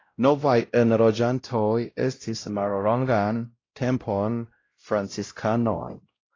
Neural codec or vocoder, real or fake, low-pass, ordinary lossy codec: codec, 16 kHz, 0.5 kbps, X-Codec, WavLM features, trained on Multilingual LibriSpeech; fake; 7.2 kHz; AAC, 32 kbps